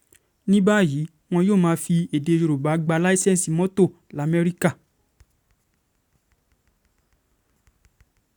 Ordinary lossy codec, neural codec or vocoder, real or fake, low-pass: none; none; real; 19.8 kHz